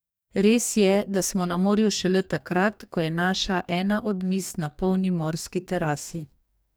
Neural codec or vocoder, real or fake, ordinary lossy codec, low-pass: codec, 44.1 kHz, 2.6 kbps, DAC; fake; none; none